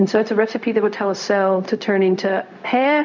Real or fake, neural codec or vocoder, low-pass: fake; codec, 16 kHz, 0.4 kbps, LongCat-Audio-Codec; 7.2 kHz